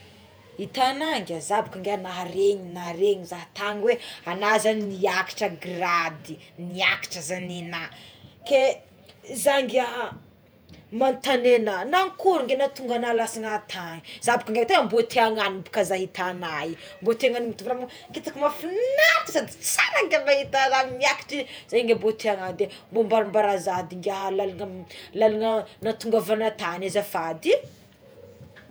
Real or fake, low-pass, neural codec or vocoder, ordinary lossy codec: fake; none; vocoder, 48 kHz, 128 mel bands, Vocos; none